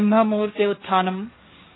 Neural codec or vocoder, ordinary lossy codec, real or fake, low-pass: codec, 16 kHz, 1.1 kbps, Voila-Tokenizer; AAC, 16 kbps; fake; 7.2 kHz